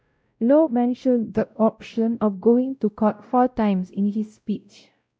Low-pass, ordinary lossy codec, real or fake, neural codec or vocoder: none; none; fake; codec, 16 kHz, 0.5 kbps, X-Codec, WavLM features, trained on Multilingual LibriSpeech